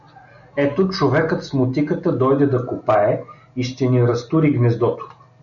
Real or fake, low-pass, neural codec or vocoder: real; 7.2 kHz; none